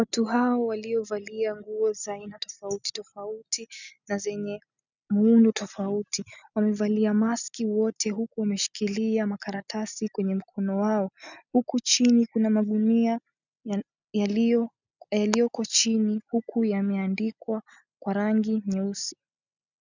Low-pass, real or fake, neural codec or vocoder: 7.2 kHz; real; none